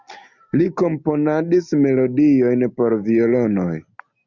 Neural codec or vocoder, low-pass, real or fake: none; 7.2 kHz; real